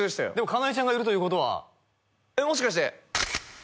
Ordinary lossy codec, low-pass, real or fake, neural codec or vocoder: none; none; real; none